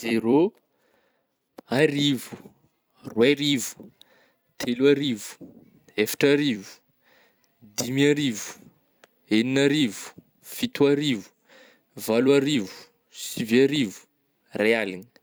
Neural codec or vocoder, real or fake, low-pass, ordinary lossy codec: vocoder, 44.1 kHz, 128 mel bands every 256 samples, BigVGAN v2; fake; none; none